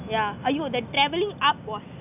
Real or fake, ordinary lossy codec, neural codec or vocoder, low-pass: real; none; none; 3.6 kHz